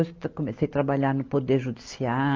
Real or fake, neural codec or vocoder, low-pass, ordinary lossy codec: real; none; 7.2 kHz; Opus, 24 kbps